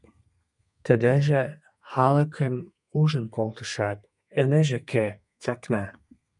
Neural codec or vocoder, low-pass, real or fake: codec, 32 kHz, 1.9 kbps, SNAC; 10.8 kHz; fake